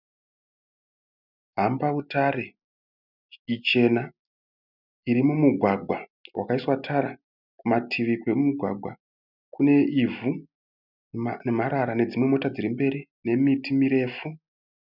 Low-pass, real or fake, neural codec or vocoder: 5.4 kHz; real; none